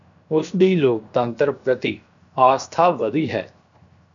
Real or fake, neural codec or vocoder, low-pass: fake; codec, 16 kHz, 0.7 kbps, FocalCodec; 7.2 kHz